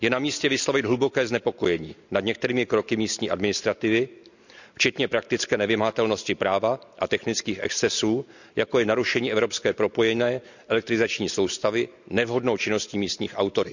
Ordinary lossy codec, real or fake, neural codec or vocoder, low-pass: none; real; none; 7.2 kHz